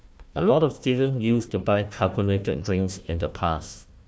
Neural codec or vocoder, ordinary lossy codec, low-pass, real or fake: codec, 16 kHz, 1 kbps, FunCodec, trained on Chinese and English, 50 frames a second; none; none; fake